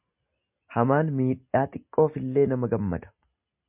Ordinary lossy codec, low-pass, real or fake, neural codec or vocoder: MP3, 32 kbps; 3.6 kHz; real; none